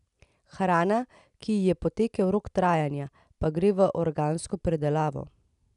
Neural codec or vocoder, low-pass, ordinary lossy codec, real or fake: none; 10.8 kHz; none; real